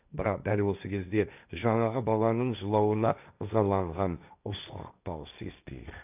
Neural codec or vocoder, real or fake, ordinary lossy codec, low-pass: codec, 16 kHz, 1.1 kbps, Voila-Tokenizer; fake; none; 3.6 kHz